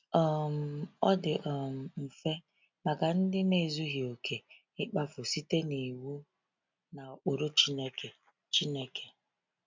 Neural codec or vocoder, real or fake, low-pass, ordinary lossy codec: none; real; 7.2 kHz; MP3, 64 kbps